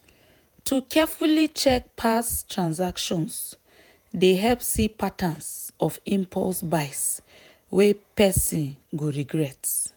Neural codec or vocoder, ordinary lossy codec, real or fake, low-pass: vocoder, 48 kHz, 128 mel bands, Vocos; none; fake; none